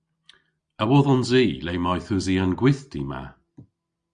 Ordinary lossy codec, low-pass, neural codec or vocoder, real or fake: Opus, 64 kbps; 9.9 kHz; none; real